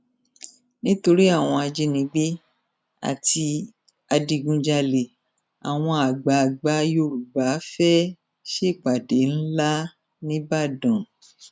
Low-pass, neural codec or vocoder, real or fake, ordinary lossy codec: none; none; real; none